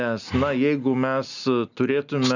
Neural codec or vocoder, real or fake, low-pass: none; real; 7.2 kHz